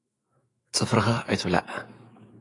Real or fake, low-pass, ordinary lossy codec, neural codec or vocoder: fake; 10.8 kHz; AAC, 32 kbps; autoencoder, 48 kHz, 128 numbers a frame, DAC-VAE, trained on Japanese speech